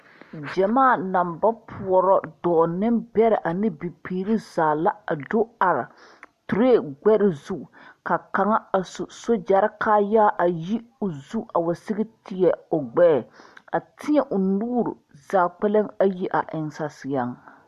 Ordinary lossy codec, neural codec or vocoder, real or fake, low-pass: MP3, 64 kbps; none; real; 14.4 kHz